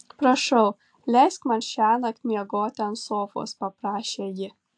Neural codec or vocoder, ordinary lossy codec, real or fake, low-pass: none; AAC, 64 kbps; real; 9.9 kHz